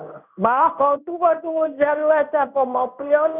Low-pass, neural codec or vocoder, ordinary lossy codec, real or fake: 3.6 kHz; codec, 16 kHz in and 24 kHz out, 1 kbps, XY-Tokenizer; none; fake